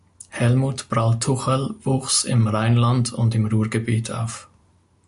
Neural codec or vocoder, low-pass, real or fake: none; 10.8 kHz; real